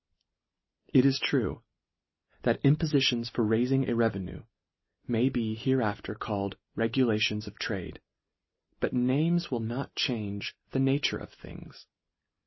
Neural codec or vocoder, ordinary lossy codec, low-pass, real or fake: none; MP3, 24 kbps; 7.2 kHz; real